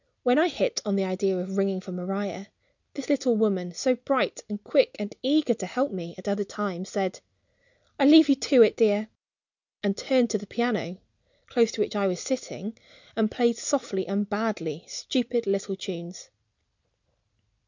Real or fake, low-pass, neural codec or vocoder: real; 7.2 kHz; none